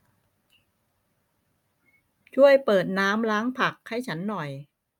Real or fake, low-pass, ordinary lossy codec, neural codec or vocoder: real; 19.8 kHz; none; none